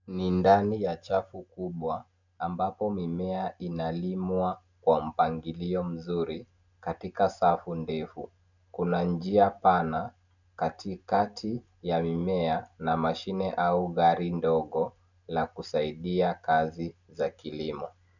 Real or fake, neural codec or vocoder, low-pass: real; none; 7.2 kHz